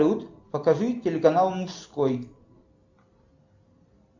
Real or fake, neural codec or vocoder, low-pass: real; none; 7.2 kHz